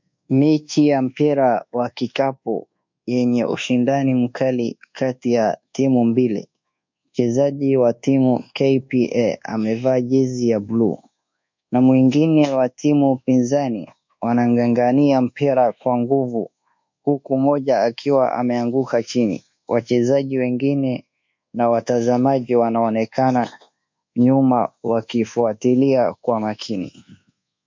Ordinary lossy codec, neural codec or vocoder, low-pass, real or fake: MP3, 64 kbps; codec, 24 kHz, 1.2 kbps, DualCodec; 7.2 kHz; fake